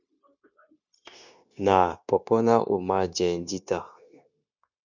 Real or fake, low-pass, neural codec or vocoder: fake; 7.2 kHz; codec, 16 kHz, 0.9 kbps, LongCat-Audio-Codec